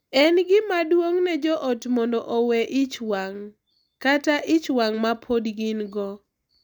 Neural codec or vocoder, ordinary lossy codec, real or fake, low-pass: none; none; real; 19.8 kHz